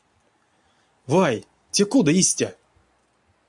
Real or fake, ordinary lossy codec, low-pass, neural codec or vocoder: real; MP3, 96 kbps; 10.8 kHz; none